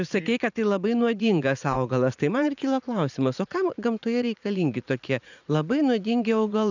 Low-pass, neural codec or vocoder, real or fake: 7.2 kHz; vocoder, 44.1 kHz, 80 mel bands, Vocos; fake